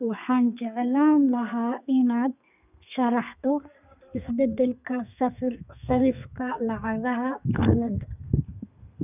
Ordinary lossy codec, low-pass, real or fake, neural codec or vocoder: none; 3.6 kHz; fake; codec, 32 kHz, 1.9 kbps, SNAC